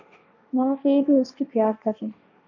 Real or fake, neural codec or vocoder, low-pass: fake; codec, 16 kHz, 1.1 kbps, Voila-Tokenizer; 7.2 kHz